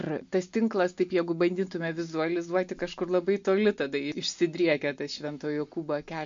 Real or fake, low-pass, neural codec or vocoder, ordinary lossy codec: real; 7.2 kHz; none; MP3, 48 kbps